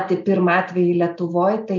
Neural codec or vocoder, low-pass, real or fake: none; 7.2 kHz; real